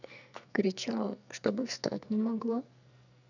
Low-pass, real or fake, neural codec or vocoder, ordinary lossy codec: 7.2 kHz; fake; codec, 44.1 kHz, 2.6 kbps, SNAC; none